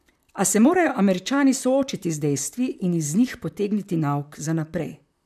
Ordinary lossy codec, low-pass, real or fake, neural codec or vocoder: none; 14.4 kHz; fake; vocoder, 44.1 kHz, 128 mel bands, Pupu-Vocoder